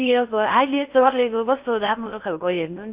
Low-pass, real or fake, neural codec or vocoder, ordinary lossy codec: 3.6 kHz; fake; codec, 16 kHz in and 24 kHz out, 0.8 kbps, FocalCodec, streaming, 65536 codes; Opus, 64 kbps